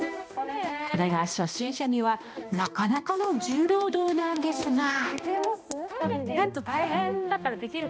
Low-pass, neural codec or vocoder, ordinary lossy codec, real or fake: none; codec, 16 kHz, 1 kbps, X-Codec, HuBERT features, trained on balanced general audio; none; fake